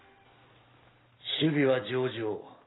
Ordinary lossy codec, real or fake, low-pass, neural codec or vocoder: AAC, 16 kbps; real; 7.2 kHz; none